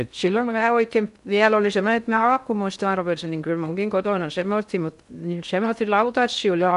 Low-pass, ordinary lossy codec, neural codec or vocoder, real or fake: 10.8 kHz; none; codec, 16 kHz in and 24 kHz out, 0.6 kbps, FocalCodec, streaming, 2048 codes; fake